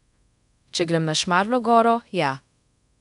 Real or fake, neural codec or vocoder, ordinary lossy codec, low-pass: fake; codec, 24 kHz, 0.5 kbps, DualCodec; none; 10.8 kHz